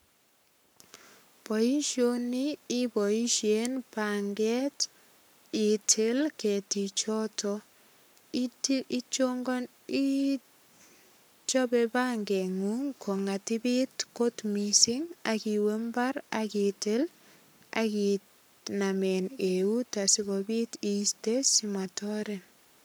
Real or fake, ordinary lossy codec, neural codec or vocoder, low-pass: fake; none; codec, 44.1 kHz, 7.8 kbps, Pupu-Codec; none